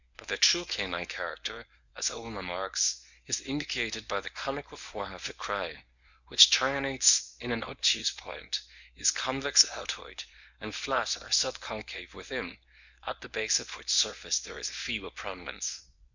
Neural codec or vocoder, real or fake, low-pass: codec, 24 kHz, 0.9 kbps, WavTokenizer, medium speech release version 1; fake; 7.2 kHz